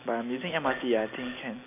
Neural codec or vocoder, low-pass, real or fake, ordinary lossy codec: none; 3.6 kHz; real; none